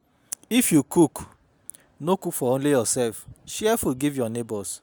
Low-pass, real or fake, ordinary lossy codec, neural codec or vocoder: none; real; none; none